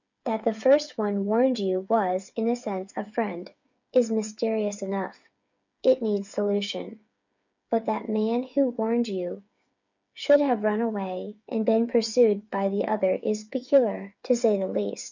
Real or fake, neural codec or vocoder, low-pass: fake; codec, 16 kHz, 8 kbps, FreqCodec, smaller model; 7.2 kHz